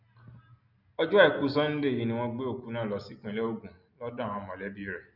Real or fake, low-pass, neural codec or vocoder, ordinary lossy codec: real; 5.4 kHz; none; none